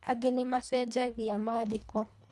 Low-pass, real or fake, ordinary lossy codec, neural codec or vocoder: none; fake; none; codec, 24 kHz, 1.5 kbps, HILCodec